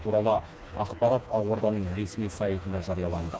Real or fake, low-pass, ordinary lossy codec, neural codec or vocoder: fake; none; none; codec, 16 kHz, 2 kbps, FreqCodec, smaller model